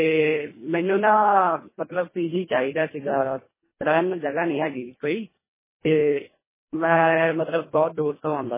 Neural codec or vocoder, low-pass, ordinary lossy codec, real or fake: codec, 24 kHz, 1.5 kbps, HILCodec; 3.6 kHz; MP3, 16 kbps; fake